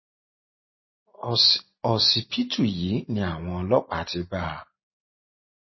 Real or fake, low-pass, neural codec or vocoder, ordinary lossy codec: real; 7.2 kHz; none; MP3, 24 kbps